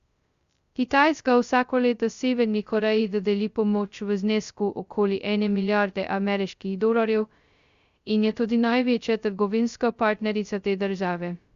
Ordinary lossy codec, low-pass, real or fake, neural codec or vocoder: Opus, 64 kbps; 7.2 kHz; fake; codec, 16 kHz, 0.2 kbps, FocalCodec